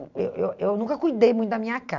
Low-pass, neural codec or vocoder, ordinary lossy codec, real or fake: 7.2 kHz; vocoder, 44.1 kHz, 128 mel bands every 512 samples, BigVGAN v2; none; fake